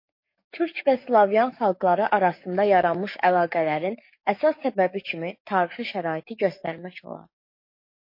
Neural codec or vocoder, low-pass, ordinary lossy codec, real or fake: codec, 44.1 kHz, 7.8 kbps, DAC; 5.4 kHz; MP3, 32 kbps; fake